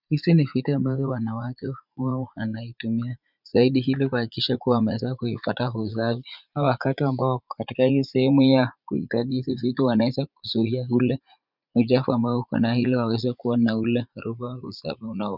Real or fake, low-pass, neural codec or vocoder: fake; 5.4 kHz; vocoder, 44.1 kHz, 80 mel bands, Vocos